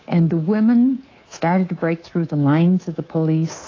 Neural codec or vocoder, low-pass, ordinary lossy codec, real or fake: codec, 24 kHz, 3.1 kbps, DualCodec; 7.2 kHz; AAC, 32 kbps; fake